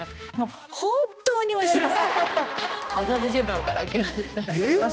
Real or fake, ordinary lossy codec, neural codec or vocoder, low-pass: fake; none; codec, 16 kHz, 1 kbps, X-Codec, HuBERT features, trained on balanced general audio; none